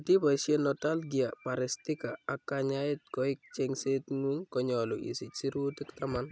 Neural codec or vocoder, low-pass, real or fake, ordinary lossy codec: none; none; real; none